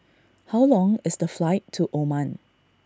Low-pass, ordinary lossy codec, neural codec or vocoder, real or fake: none; none; none; real